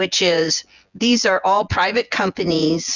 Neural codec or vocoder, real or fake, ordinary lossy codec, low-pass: vocoder, 24 kHz, 100 mel bands, Vocos; fake; Opus, 64 kbps; 7.2 kHz